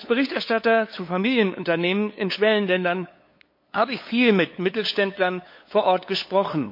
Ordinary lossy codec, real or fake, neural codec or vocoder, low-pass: MP3, 32 kbps; fake; codec, 16 kHz, 8 kbps, FunCodec, trained on LibriTTS, 25 frames a second; 5.4 kHz